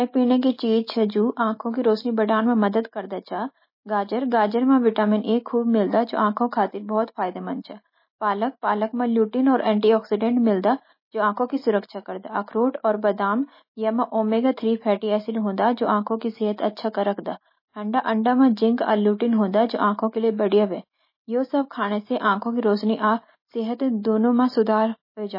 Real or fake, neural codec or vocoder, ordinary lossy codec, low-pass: real; none; MP3, 24 kbps; 5.4 kHz